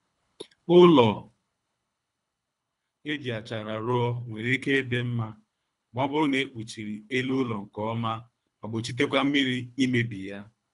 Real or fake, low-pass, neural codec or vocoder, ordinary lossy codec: fake; 10.8 kHz; codec, 24 kHz, 3 kbps, HILCodec; none